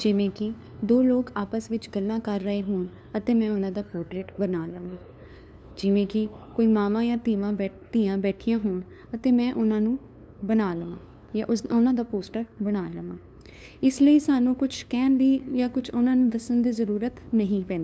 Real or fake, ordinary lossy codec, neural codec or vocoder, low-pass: fake; none; codec, 16 kHz, 2 kbps, FunCodec, trained on LibriTTS, 25 frames a second; none